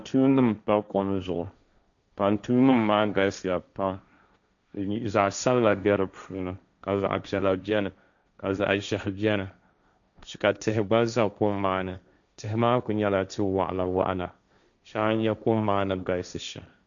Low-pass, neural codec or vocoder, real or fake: 7.2 kHz; codec, 16 kHz, 1.1 kbps, Voila-Tokenizer; fake